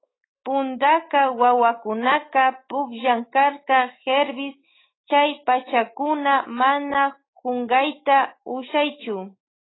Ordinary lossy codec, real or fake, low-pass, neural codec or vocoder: AAC, 16 kbps; fake; 7.2 kHz; autoencoder, 48 kHz, 128 numbers a frame, DAC-VAE, trained on Japanese speech